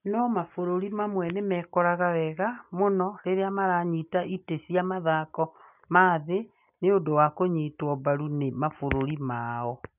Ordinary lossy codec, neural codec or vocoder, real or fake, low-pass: none; none; real; 3.6 kHz